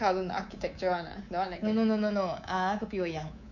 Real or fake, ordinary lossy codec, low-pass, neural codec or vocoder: fake; none; 7.2 kHz; codec, 24 kHz, 3.1 kbps, DualCodec